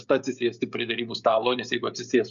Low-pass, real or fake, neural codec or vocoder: 7.2 kHz; fake; codec, 16 kHz, 16 kbps, FreqCodec, smaller model